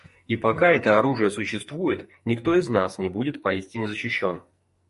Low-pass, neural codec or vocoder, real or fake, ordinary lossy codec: 14.4 kHz; codec, 44.1 kHz, 2.6 kbps, SNAC; fake; MP3, 48 kbps